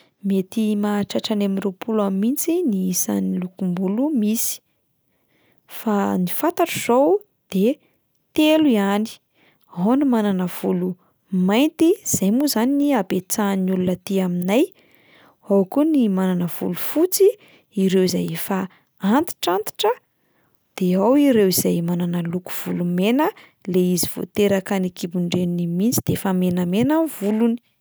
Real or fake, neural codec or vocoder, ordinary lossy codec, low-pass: real; none; none; none